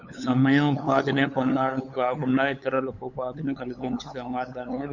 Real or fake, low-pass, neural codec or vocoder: fake; 7.2 kHz; codec, 16 kHz, 8 kbps, FunCodec, trained on LibriTTS, 25 frames a second